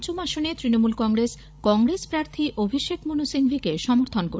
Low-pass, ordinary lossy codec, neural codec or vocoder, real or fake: none; none; codec, 16 kHz, 16 kbps, FreqCodec, larger model; fake